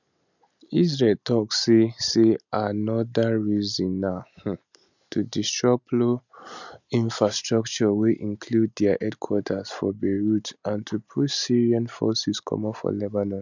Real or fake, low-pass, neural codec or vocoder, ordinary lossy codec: real; 7.2 kHz; none; none